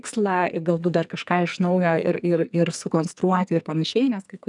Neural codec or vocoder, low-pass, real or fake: codec, 44.1 kHz, 2.6 kbps, SNAC; 10.8 kHz; fake